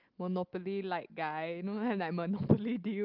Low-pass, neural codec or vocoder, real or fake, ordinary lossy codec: 5.4 kHz; none; real; Opus, 32 kbps